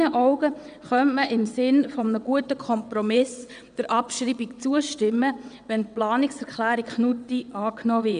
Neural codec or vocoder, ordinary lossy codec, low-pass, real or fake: vocoder, 22.05 kHz, 80 mel bands, WaveNeXt; none; 9.9 kHz; fake